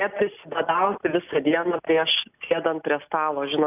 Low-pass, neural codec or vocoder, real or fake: 3.6 kHz; none; real